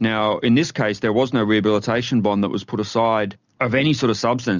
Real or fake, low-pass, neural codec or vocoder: real; 7.2 kHz; none